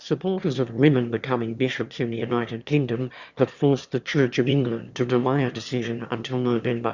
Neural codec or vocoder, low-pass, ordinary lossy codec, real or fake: autoencoder, 22.05 kHz, a latent of 192 numbers a frame, VITS, trained on one speaker; 7.2 kHz; Opus, 64 kbps; fake